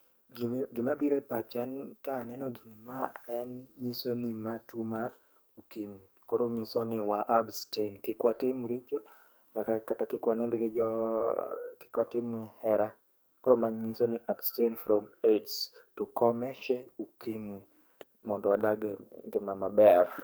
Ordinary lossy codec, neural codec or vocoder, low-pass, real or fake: none; codec, 44.1 kHz, 2.6 kbps, SNAC; none; fake